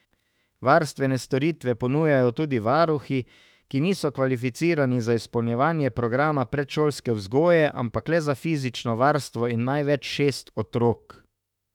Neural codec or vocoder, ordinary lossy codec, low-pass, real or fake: autoencoder, 48 kHz, 32 numbers a frame, DAC-VAE, trained on Japanese speech; none; 19.8 kHz; fake